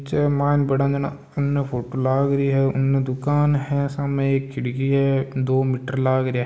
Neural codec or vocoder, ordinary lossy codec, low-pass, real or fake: none; none; none; real